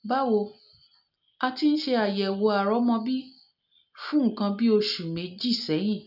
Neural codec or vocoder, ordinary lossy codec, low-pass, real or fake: none; none; 5.4 kHz; real